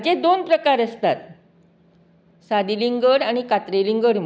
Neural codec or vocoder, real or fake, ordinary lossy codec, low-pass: none; real; none; none